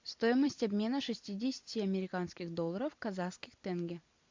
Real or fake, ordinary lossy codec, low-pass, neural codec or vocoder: fake; MP3, 64 kbps; 7.2 kHz; vocoder, 44.1 kHz, 128 mel bands every 256 samples, BigVGAN v2